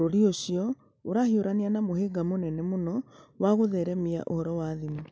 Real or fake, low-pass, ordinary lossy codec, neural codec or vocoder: real; none; none; none